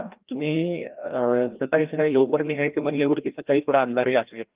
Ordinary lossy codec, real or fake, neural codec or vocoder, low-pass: Opus, 32 kbps; fake; codec, 16 kHz, 1 kbps, FreqCodec, larger model; 3.6 kHz